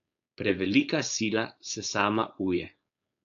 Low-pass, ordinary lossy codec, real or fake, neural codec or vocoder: 7.2 kHz; AAC, 64 kbps; fake; codec, 16 kHz, 4.8 kbps, FACodec